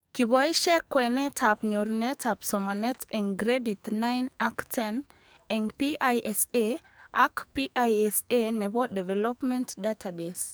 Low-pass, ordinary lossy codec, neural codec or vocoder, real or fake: none; none; codec, 44.1 kHz, 2.6 kbps, SNAC; fake